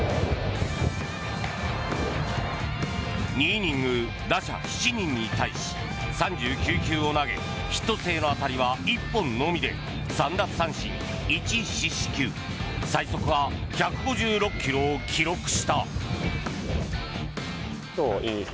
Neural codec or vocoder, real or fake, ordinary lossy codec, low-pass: none; real; none; none